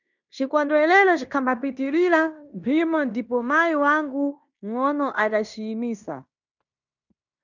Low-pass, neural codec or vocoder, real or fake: 7.2 kHz; codec, 16 kHz in and 24 kHz out, 0.9 kbps, LongCat-Audio-Codec, fine tuned four codebook decoder; fake